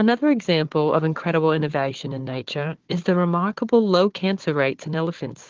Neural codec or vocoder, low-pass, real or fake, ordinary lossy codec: codec, 16 kHz, 4 kbps, FunCodec, trained on Chinese and English, 50 frames a second; 7.2 kHz; fake; Opus, 16 kbps